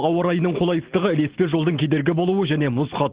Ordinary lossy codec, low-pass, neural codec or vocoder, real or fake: Opus, 16 kbps; 3.6 kHz; none; real